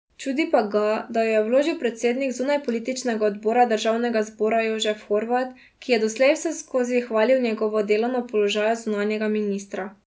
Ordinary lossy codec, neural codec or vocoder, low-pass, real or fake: none; none; none; real